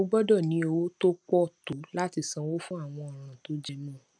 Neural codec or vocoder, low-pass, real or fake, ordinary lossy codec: none; 9.9 kHz; real; none